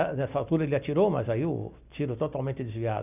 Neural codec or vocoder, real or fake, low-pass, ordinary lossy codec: none; real; 3.6 kHz; none